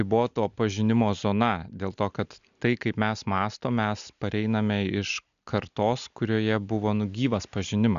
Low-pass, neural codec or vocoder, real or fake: 7.2 kHz; none; real